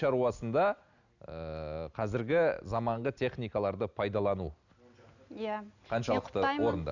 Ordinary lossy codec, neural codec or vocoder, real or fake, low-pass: none; none; real; 7.2 kHz